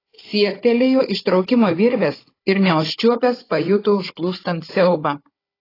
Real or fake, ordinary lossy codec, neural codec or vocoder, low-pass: fake; AAC, 24 kbps; codec, 16 kHz, 16 kbps, FunCodec, trained on Chinese and English, 50 frames a second; 5.4 kHz